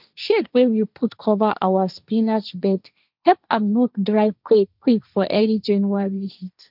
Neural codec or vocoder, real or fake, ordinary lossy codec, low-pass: codec, 16 kHz, 1.1 kbps, Voila-Tokenizer; fake; none; 5.4 kHz